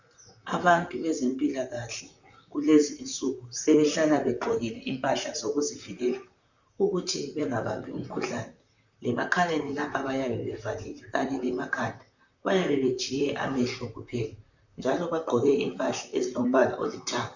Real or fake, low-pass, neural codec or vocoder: fake; 7.2 kHz; vocoder, 44.1 kHz, 128 mel bands, Pupu-Vocoder